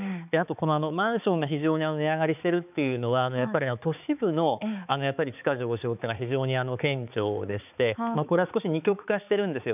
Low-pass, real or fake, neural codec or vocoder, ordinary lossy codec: 3.6 kHz; fake; codec, 16 kHz, 4 kbps, X-Codec, HuBERT features, trained on balanced general audio; none